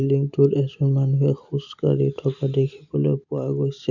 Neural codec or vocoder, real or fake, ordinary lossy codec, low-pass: none; real; none; 7.2 kHz